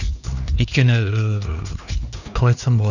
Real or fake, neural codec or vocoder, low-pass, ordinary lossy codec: fake; codec, 16 kHz, 2 kbps, X-Codec, HuBERT features, trained on LibriSpeech; 7.2 kHz; none